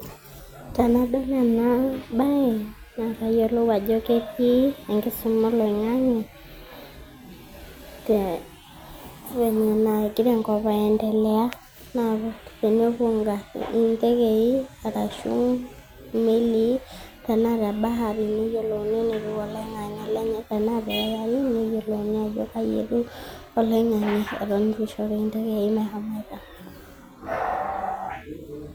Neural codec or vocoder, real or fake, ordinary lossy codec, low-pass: none; real; none; none